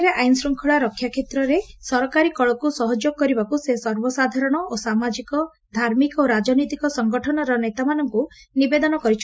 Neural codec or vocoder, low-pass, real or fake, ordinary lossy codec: none; none; real; none